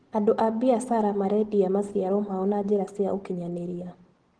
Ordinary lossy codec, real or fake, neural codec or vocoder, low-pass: Opus, 16 kbps; real; none; 9.9 kHz